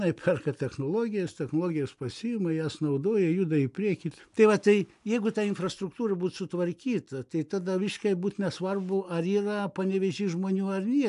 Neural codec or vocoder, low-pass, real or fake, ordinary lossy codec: none; 10.8 kHz; real; AAC, 96 kbps